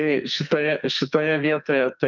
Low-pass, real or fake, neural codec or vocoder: 7.2 kHz; fake; codec, 44.1 kHz, 2.6 kbps, SNAC